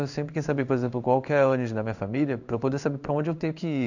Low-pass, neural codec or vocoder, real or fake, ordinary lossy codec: 7.2 kHz; codec, 16 kHz in and 24 kHz out, 1 kbps, XY-Tokenizer; fake; none